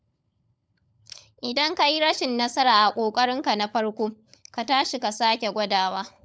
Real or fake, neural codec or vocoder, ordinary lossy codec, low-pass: fake; codec, 16 kHz, 16 kbps, FunCodec, trained on LibriTTS, 50 frames a second; none; none